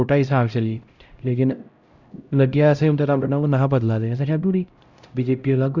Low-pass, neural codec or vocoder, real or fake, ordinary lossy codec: 7.2 kHz; codec, 16 kHz, 0.5 kbps, X-Codec, HuBERT features, trained on LibriSpeech; fake; Opus, 64 kbps